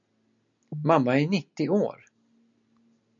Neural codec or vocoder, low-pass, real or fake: none; 7.2 kHz; real